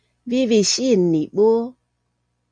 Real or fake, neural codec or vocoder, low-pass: real; none; 9.9 kHz